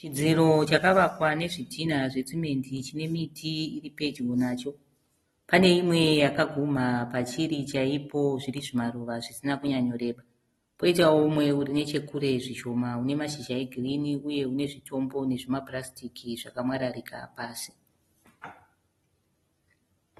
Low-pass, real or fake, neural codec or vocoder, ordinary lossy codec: 19.8 kHz; real; none; AAC, 32 kbps